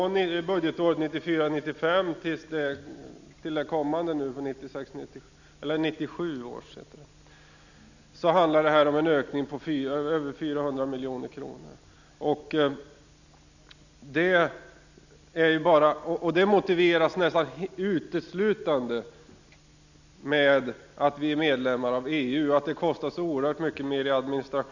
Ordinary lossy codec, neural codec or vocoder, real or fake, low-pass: none; none; real; 7.2 kHz